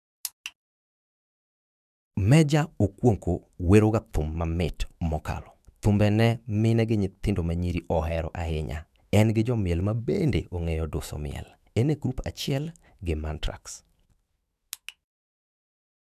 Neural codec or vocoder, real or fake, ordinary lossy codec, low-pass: autoencoder, 48 kHz, 128 numbers a frame, DAC-VAE, trained on Japanese speech; fake; none; 14.4 kHz